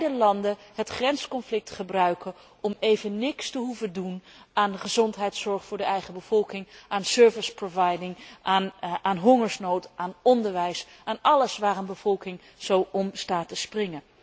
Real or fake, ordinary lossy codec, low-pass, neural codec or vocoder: real; none; none; none